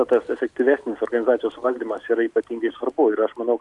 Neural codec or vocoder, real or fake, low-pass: none; real; 10.8 kHz